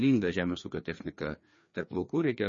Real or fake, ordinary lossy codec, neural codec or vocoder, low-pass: fake; MP3, 32 kbps; codec, 16 kHz, 2 kbps, FreqCodec, larger model; 7.2 kHz